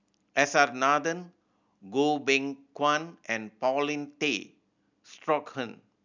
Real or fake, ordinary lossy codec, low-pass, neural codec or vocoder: real; none; 7.2 kHz; none